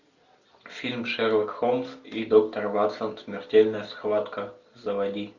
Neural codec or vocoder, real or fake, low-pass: none; real; 7.2 kHz